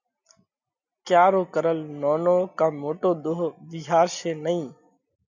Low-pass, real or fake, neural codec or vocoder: 7.2 kHz; real; none